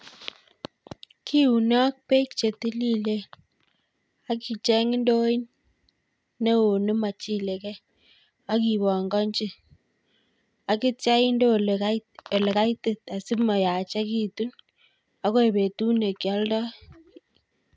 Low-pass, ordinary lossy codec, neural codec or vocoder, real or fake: none; none; none; real